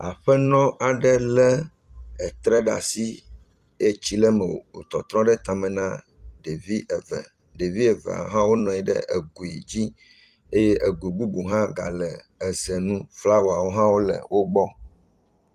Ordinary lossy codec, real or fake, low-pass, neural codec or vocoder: Opus, 32 kbps; fake; 14.4 kHz; vocoder, 44.1 kHz, 128 mel bands every 256 samples, BigVGAN v2